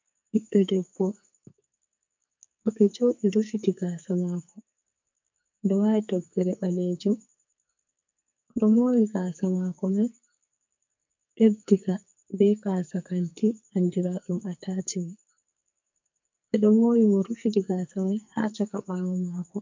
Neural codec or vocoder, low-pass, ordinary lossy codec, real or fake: codec, 44.1 kHz, 2.6 kbps, SNAC; 7.2 kHz; MP3, 64 kbps; fake